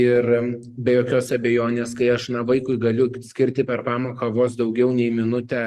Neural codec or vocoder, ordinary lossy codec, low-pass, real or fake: codec, 44.1 kHz, 7.8 kbps, DAC; Opus, 24 kbps; 14.4 kHz; fake